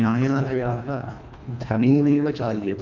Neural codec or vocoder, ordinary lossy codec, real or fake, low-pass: codec, 24 kHz, 1.5 kbps, HILCodec; none; fake; 7.2 kHz